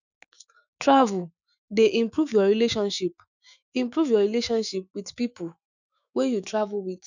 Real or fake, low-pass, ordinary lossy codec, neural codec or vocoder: fake; 7.2 kHz; none; autoencoder, 48 kHz, 128 numbers a frame, DAC-VAE, trained on Japanese speech